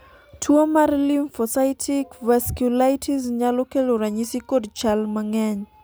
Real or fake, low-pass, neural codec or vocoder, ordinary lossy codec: real; none; none; none